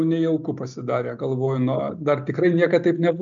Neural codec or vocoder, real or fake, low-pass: none; real; 7.2 kHz